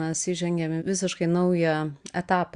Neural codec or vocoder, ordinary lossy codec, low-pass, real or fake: none; Opus, 64 kbps; 9.9 kHz; real